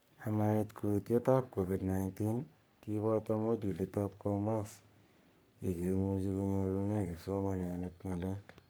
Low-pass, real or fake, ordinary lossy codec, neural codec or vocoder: none; fake; none; codec, 44.1 kHz, 3.4 kbps, Pupu-Codec